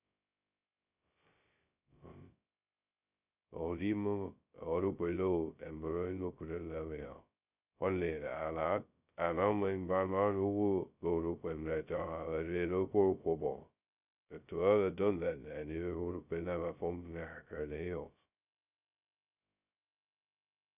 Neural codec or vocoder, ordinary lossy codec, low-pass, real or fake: codec, 16 kHz, 0.2 kbps, FocalCodec; none; 3.6 kHz; fake